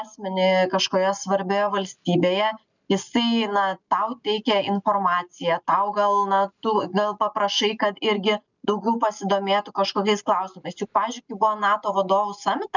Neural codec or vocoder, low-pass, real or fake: none; 7.2 kHz; real